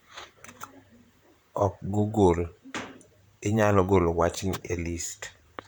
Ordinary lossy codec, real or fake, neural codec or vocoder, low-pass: none; fake; vocoder, 44.1 kHz, 128 mel bands, Pupu-Vocoder; none